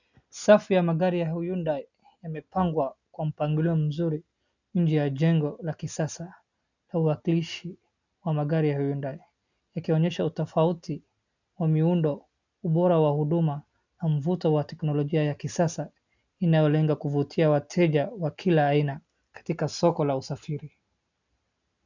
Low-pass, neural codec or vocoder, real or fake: 7.2 kHz; none; real